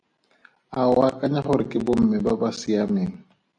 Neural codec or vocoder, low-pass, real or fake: none; 9.9 kHz; real